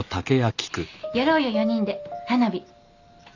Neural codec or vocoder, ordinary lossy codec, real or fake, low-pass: none; none; real; 7.2 kHz